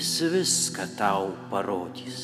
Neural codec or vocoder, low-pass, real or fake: none; 14.4 kHz; real